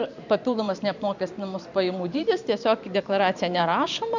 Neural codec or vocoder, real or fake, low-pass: vocoder, 44.1 kHz, 128 mel bands every 512 samples, BigVGAN v2; fake; 7.2 kHz